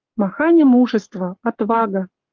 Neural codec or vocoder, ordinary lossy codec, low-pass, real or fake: codec, 44.1 kHz, 7.8 kbps, Pupu-Codec; Opus, 32 kbps; 7.2 kHz; fake